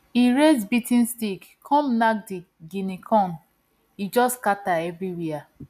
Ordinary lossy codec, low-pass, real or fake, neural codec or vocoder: none; 14.4 kHz; real; none